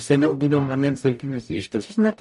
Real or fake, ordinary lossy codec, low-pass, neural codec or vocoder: fake; MP3, 48 kbps; 14.4 kHz; codec, 44.1 kHz, 0.9 kbps, DAC